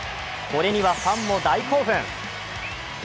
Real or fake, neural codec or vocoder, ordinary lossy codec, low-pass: real; none; none; none